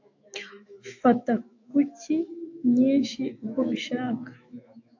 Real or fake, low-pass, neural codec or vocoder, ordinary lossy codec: fake; 7.2 kHz; autoencoder, 48 kHz, 128 numbers a frame, DAC-VAE, trained on Japanese speech; AAC, 48 kbps